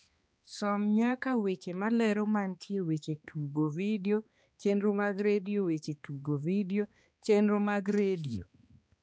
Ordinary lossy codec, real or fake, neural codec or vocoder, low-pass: none; fake; codec, 16 kHz, 2 kbps, X-Codec, HuBERT features, trained on balanced general audio; none